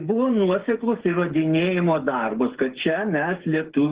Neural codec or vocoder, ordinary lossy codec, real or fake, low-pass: codec, 16 kHz, 8 kbps, FreqCodec, smaller model; Opus, 16 kbps; fake; 3.6 kHz